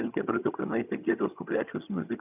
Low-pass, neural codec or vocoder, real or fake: 3.6 kHz; vocoder, 22.05 kHz, 80 mel bands, HiFi-GAN; fake